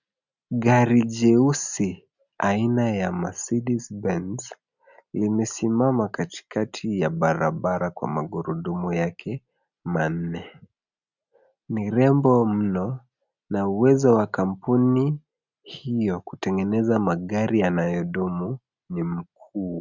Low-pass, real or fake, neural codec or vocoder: 7.2 kHz; real; none